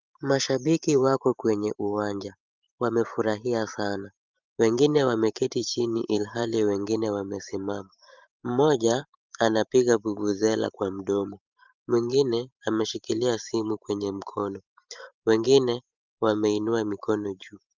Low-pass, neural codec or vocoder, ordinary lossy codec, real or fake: 7.2 kHz; vocoder, 44.1 kHz, 128 mel bands every 512 samples, BigVGAN v2; Opus, 24 kbps; fake